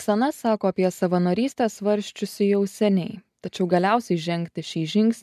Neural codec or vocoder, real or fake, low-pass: none; real; 14.4 kHz